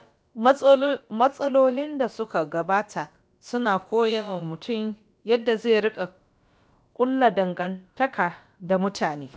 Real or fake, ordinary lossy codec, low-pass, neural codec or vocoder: fake; none; none; codec, 16 kHz, about 1 kbps, DyCAST, with the encoder's durations